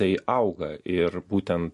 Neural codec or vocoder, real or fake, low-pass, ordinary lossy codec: none; real; 14.4 kHz; MP3, 48 kbps